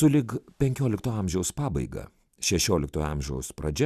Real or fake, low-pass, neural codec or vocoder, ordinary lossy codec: real; 14.4 kHz; none; Opus, 64 kbps